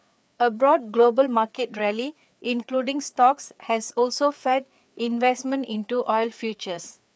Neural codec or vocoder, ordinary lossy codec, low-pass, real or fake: codec, 16 kHz, 4 kbps, FreqCodec, larger model; none; none; fake